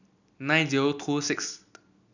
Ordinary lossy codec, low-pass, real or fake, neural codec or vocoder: none; 7.2 kHz; real; none